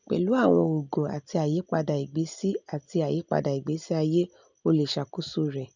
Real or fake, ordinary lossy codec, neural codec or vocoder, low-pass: real; none; none; 7.2 kHz